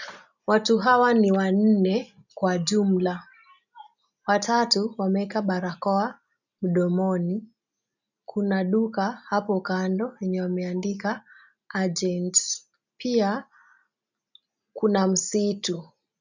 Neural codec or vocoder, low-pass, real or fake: none; 7.2 kHz; real